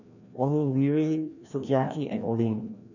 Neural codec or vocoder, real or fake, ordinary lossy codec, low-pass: codec, 16 kHz, 1 kbps, FreqCodec, larger model; fake; none; 7.2 kHz